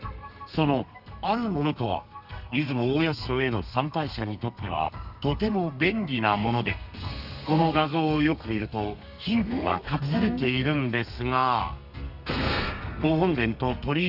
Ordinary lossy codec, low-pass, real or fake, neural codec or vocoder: none; 5.4 kHz; fake; codec, 32 kHz, 1.9 kbps, SNAC